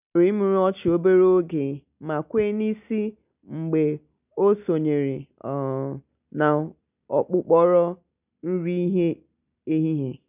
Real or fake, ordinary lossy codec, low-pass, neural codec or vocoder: real; none; 3.6 kHz; none